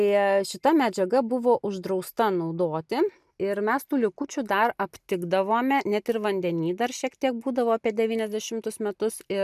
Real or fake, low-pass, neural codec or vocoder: real; 14.4 kHz; none